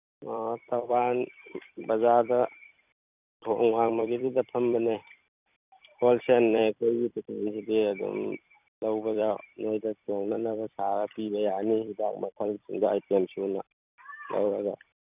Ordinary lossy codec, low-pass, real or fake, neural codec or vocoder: none; 3.6 kHz; fake; vocoder, 44.1 kHz, 128 mel bands every 256 samples, BigVGAN v2